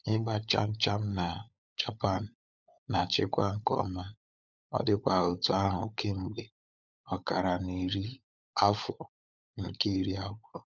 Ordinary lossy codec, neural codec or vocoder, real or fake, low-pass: none; codec, 16 kHz, 16 kbps, FunCodec, trained on LibriTTS, 50 frames a second; fake; 7.2 kHz